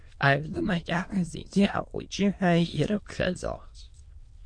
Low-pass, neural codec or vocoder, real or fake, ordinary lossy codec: 9.9 kHz; autoencoder, 22.05 kHz, a latent of 192 numbers a frame, VITS, trained on many speakers; fake; MP3, 48 kbps